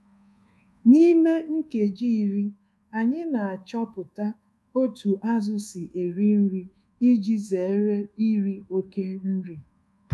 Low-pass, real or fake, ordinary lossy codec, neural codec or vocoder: none; fake; none; codec, 24 kHz, 1.2 kbps, DualCodec